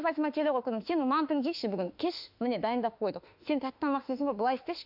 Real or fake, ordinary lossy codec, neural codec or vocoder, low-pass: fake; AAC, 48 kbps; autoencoder, 48 kHz, 32 numbers a frame, DAC-VAE, trained on Japanese speech; 5.4 kHz